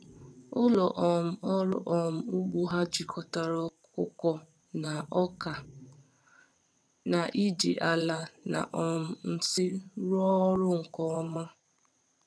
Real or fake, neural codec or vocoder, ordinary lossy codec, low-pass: fake; vocoder, 22.05 kHz, 80 mel bands, WaveNeXt; none; none